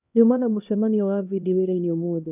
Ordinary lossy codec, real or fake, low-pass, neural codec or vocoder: none; fake; 3.6 kHz; codec, 16 kHz, 1 kbps, X-Codec, HuBERT features, trained on LibriSpeech